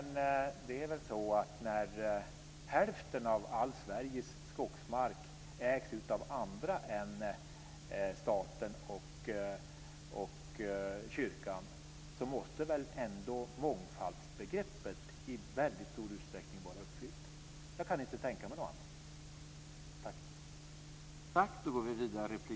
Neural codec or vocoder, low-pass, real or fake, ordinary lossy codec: none; none; real; none